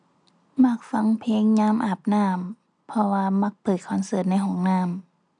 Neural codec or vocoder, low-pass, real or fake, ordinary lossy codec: none; 9.9 kHz; real; none